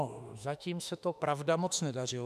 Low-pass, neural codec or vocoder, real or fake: 14.4 kHz; autoencoder, 48 kHz, 32 numbers a frame, DAC-VAE, trained on Japanese speech; fake